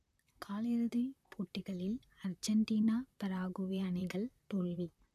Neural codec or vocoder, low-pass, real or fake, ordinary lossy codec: vocoder, 44.1 kHz, 128 mel bands, Pupu-Vocoder; 14.4 kHz; fake; none